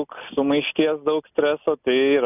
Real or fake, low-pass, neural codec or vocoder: real; 3.6 kHz; none